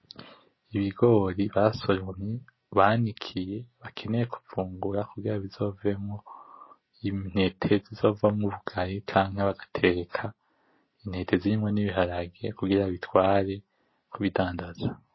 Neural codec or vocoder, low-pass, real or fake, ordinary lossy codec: none; 7.2 kHz; real; MP3, 24 kbps